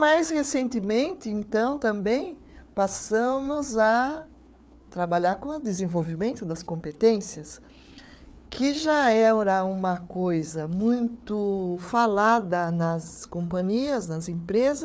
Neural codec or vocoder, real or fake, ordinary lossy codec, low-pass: codec, 16 kHz, 4 kbps, FreqCodec, larger model; fake; none; none